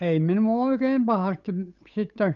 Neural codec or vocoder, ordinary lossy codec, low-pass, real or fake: codec, 16 kHz, 8 kbps, FunCodec, trained on Chinese and English, 25 frames a second; none; 7.2 kHz; fake